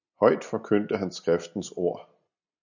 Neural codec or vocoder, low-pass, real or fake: none; 7.2 kHz; real